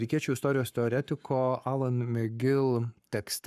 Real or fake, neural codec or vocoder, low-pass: fake; codec, 44.1 kHz, 7.8 kbps, Pupu-Codec; 14.4 kHz